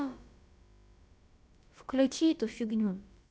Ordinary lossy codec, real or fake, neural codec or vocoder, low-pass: none; fake; codec, 16 kHz, about 1 kbps, DyCAST, with the encoder's durations; none